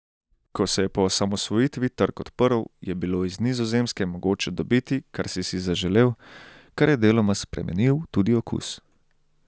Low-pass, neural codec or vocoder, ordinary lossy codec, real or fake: none; none; none; real